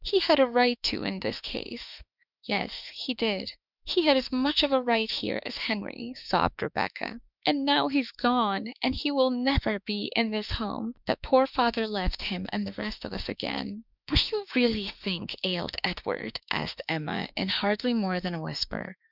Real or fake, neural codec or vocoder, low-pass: fake; autoencoder, 48 kHz, 32 numbers a frame, DAC-VAE, trained on Japanese speech; 5.4 kHz